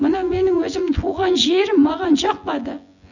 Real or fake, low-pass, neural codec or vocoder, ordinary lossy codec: fake; 7.2 kHz; vocoder, 24 kHz, 100 mel bands, Vocos; none